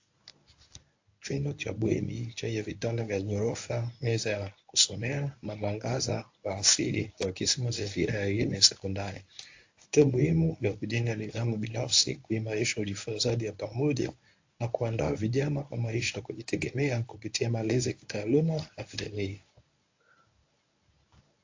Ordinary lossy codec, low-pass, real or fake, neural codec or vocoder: AAC, 48 kbps; 7.2 kHz; fake; codec, 24 kHz, 0.9 kbps, WavTokenizer, medium speech release version 1